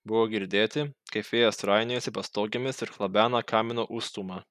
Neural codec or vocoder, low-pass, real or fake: none; 14.4 kHz; real